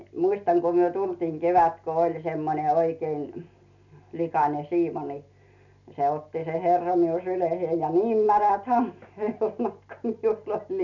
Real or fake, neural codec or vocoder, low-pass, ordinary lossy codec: real; none; 7.2 kHz; none